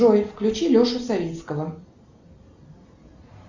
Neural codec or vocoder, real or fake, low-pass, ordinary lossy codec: none; real; 7.2 kHz; Opus, 64 kbps